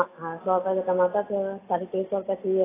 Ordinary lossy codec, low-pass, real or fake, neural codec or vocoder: AAC, 16 kbps; 3.6 kHz; real; none